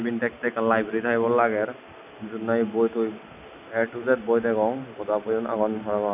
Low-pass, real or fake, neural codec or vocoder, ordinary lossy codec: 3.6 kHz; real; none; none